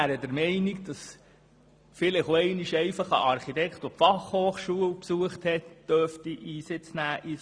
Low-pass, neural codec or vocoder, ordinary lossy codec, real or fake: 9.9 kHz; none; Opus, 64 kbps; real